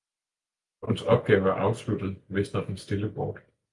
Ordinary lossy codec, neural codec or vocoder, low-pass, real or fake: Opus, 32 kbps; none; 10.8 kHz; real